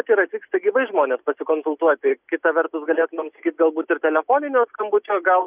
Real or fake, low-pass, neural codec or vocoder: real; 3.6 kHz; none